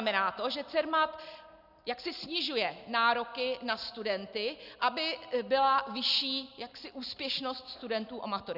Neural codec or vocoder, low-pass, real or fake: none; 5.4 kHz; real